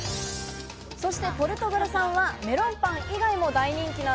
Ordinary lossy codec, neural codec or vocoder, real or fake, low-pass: none; none; real; none